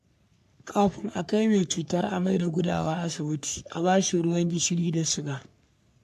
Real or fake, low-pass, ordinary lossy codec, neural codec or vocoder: fake; 14.4 kHz; AAC, 96 kbps; codec, 44.1 kHz, 3.4 kbps, Pupu-Codec